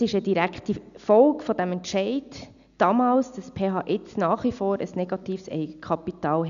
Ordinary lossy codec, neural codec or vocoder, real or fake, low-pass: none; none; real; 7.2 kHz